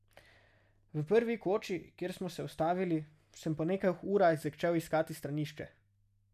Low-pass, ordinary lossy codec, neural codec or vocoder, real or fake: 14.4 kHz; none; none; real